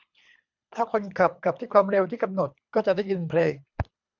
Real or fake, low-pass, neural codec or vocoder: fake; 7.2 kHz; codec, 24 kHz, 3 kbps, HILCodec